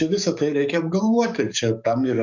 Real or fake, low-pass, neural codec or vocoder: fake; 7.2 kHz; codec, 44.1 kHz, 7.8 kbps, DAC